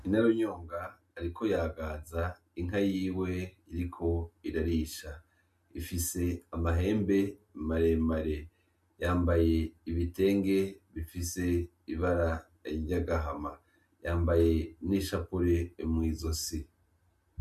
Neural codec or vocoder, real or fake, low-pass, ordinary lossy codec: none; real; 14.4 kHz; MP3, 64 kbps